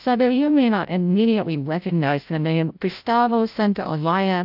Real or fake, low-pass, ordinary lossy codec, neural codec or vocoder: fake; 5.4 kHz; AAC, 48 kbps; codec, 16 kHz, 0.5 kbps, FreqCodec, larger model